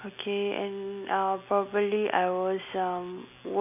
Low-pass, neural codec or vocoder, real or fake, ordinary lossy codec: 3.6 kHz; none; real; none